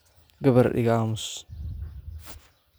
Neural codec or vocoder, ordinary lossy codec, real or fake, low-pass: none; none; real; none